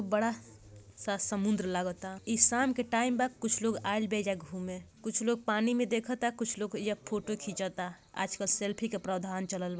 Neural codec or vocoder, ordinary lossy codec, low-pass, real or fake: none; none; none; real